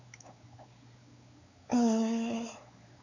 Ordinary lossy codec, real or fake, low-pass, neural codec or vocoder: none; fake; 7.2 kHz; codec, 16 kHz, 4 kbps, X-Codec, WavLM features, trained on Multilingual LibriSpeech